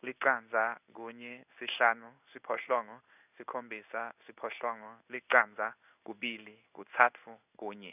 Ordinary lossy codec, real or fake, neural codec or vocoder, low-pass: none; fake; codec, 16 kHz in and 24 kHz out, 1 kbps, XY-Tokenizer; 3.6 kHz